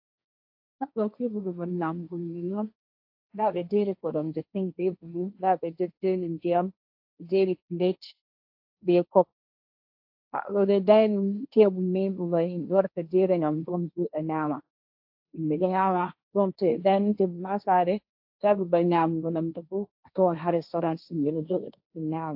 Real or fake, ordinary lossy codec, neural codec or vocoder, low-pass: fake; AAC, 48 kbps; codec, 16 kHz, 1.1 kbps, Voila-Tokenizer; 5.4 kHz